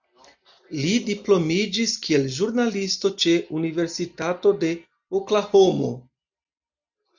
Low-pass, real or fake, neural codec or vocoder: 7.2 kHz; real; none